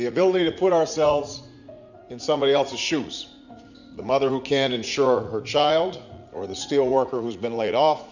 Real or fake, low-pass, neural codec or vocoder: fake; 7.2 kHz; vocoder, 44.1 kHz, 80 mel bands, Vocos